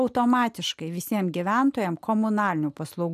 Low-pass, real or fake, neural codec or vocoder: 14.4 kHz; real; none